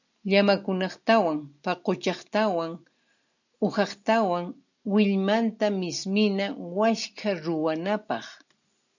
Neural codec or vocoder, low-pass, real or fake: none; 7.2 kHz; real